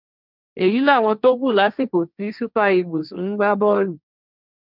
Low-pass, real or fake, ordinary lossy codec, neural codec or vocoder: 5.4 kHz; fake; none; codec, 16 kHz, 1.1 kbps, Voila-Tokenizer